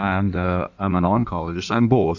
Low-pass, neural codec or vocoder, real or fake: 7.2 kHz; codec, 16 kHz in and 24 kHz out, 1.1 kbps, FireRedTTS-2 codec; fake